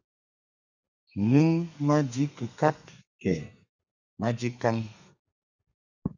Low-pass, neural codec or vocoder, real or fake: 7.2 kHz; codec, 32 kHz, 1.9 kbps, SNAC; fake